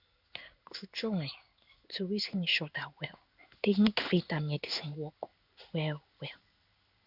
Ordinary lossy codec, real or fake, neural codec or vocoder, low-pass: none; fake; codec, 16 kHz in and 24 kHz out, 1 kbps, XY-Tokenizer; 5.4 kHz